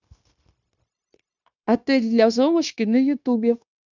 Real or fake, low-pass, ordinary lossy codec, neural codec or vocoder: fake; 7.2 kHz; none; codec, 16 kHz, 0.9 kbps, LongCat-Audio-Codec